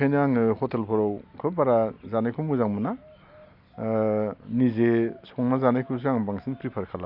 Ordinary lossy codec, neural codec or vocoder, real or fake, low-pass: none; none; real; 5.4 kHz